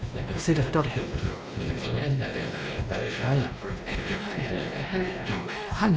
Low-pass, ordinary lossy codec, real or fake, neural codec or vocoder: none; none; fake; codec, 16 kHz, 1 kbps, X-Codec, WavLM features, trained on Multilingual LibriSpeech